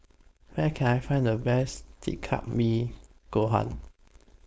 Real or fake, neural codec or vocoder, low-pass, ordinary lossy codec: fake; codec, 16 kHz, 4.8 kbps, FACodec; none; none